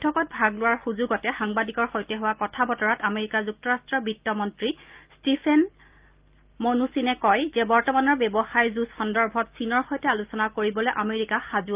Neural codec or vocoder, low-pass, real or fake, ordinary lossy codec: none; 3.6 kHz; real; Opus, 32 kbps